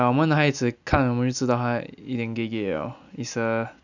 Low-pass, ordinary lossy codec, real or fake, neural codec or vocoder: 7.2 kHz; none; real; none